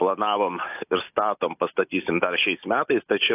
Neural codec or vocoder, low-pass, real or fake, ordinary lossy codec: none; 3.6 kHz; real; AAC, 32 kbps